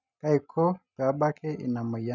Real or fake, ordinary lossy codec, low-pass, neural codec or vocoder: real; none; 7.2 kHz; none